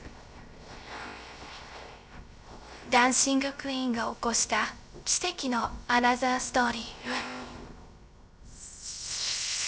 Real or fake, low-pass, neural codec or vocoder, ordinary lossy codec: fake; none; codec, 16 kHz, 0.3 kbps, FocalCodec; none